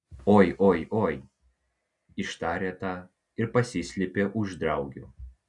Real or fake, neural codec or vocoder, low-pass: real; none; 10.8 kHz